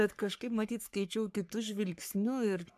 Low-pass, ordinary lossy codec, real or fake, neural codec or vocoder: 14.4 kHz; AAC, 96 kbps; fake; codec, 44.1 kHz, 3.4 kbps, Pupu-Codec